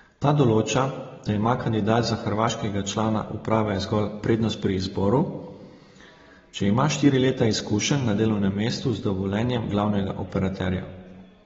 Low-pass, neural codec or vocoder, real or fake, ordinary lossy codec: 7.2 kHz; none; real; AAC, 24 kbps